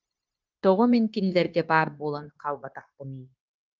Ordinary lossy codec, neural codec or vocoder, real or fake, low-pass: Opus, 24 kbps; codec, 16 kHz, 0.9 kbps, LongCat-Audio-Codec; fake; 7.2 kHz